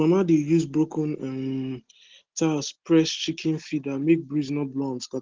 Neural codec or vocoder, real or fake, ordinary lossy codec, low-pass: none; real; Opus, 16 kbps; 7.2 kHz